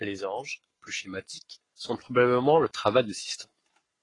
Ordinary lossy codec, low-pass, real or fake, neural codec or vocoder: AAC, 32 kbps; 10.8 kHz; fake; codec, 44.1 kHz, 7.8 kbps, Pupu-Codec